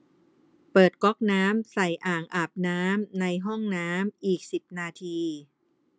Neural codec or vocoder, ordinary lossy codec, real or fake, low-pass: none; none; real; none